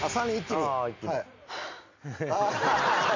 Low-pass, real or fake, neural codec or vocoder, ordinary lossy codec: 7.2 kHz; real; none; MP3, 64 kbps